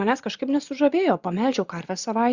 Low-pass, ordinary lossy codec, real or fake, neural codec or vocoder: 7.2 kHz; Opus, 64 kbps; fake; vocoder, 24 kHz, 100 mel bands, Vocos